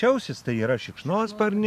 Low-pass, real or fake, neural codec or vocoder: 14.4 kHz; real; none